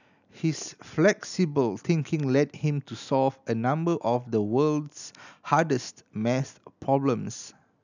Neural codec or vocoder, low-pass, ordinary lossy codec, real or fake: none; 7.2 kHz; none; real